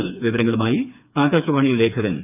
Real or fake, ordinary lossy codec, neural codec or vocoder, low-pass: fake; none; codec, 16 kHz, 4 kbps, FreqCodec, smaller model; 3.6 kHz